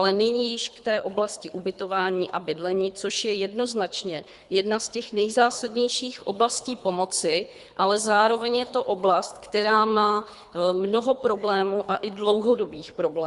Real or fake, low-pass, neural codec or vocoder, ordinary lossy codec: fake; 10.8 kHz; codec, 24 kHz, 3 kbps, HILCodec; Opus, 64 kbps